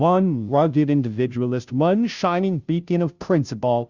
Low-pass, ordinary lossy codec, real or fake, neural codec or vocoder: 7.2 kHz; Opus, 64 kbps; fake; codec, 16 kHz, 0.5 kbps, FunCodec, trained on Chinese and English, 25 frames a second